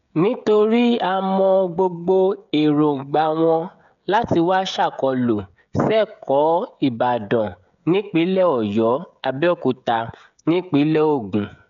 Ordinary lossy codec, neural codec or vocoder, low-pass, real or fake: none; codec, 16 kHz, 16 kbps, FreqCodec, smaller model; 7.2 kHz; fake